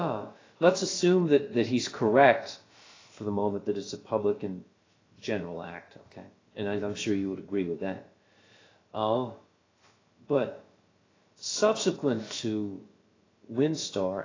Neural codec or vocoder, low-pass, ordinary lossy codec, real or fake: codec, 16 kHz, about 1 kbps, DyCAST, with the encoder's durations; 7.2 kHz; AAC, 32 kbps; fake